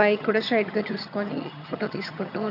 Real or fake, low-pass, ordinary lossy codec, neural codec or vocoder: fake; 5.4 kHz; none; vocoder, 22.05 kHz, 80 mel bands, HiFi-GAN